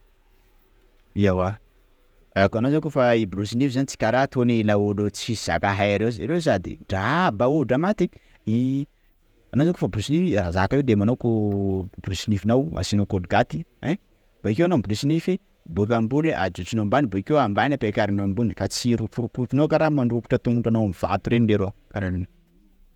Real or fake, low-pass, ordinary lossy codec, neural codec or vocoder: fake; 19.8 kHz; none; vocoder, 48 kHz, 128 mel bands, Vocos